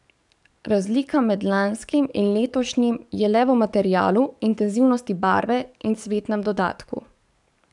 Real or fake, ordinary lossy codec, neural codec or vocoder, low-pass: fake; none; codec, 44.1 kHz, 7.8 kbps, DAC; 10.8 kHz